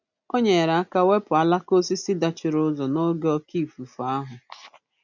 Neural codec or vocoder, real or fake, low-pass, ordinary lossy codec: none; real; 7.2 kHz; none